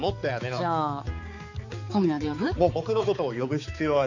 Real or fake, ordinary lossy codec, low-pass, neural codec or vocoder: fake; AAC, 48 kbps; 7.2 kHz; codec, 16 kHz, 4 kbps, X-Codec, HuBERT features, trained on balanced general audio